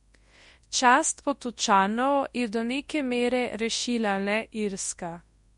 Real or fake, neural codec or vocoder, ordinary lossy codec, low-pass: fake; codec, 24 kHz, 0.9 kbps, WavTokenizer, large speech release; MP3, 48 kbps; 10.8 kHz